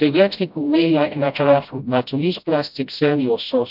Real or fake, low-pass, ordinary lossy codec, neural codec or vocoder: fake; 5.4 kHz; none; codec, 16 kHz, 0.5 kbps, FreqCodec, smaller model